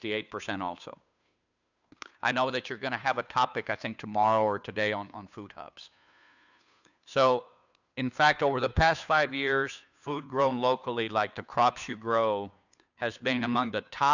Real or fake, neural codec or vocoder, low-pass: fake; codec, 16 kHz, 2 kbps, FunCodec, trained on Chinese and English, 25 frames a second; 7.2 kHz